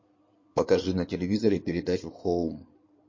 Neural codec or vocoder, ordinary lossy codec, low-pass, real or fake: codec, 16 kHz in and 24 kHz out, 2.2 kbps, FireRedTTS-2 codec; MP3, 32 kbps; 7.2 kHz; fake